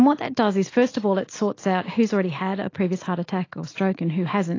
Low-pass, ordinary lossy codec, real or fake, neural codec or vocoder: 7.2 kHz; AAC, 32 kbps; real; none